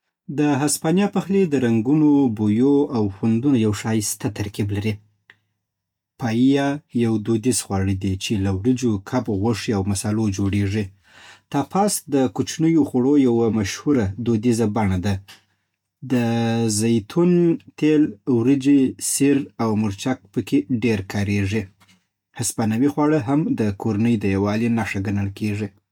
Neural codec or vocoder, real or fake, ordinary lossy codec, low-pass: none; real; MP3, 96 kbps; 19.8 kHz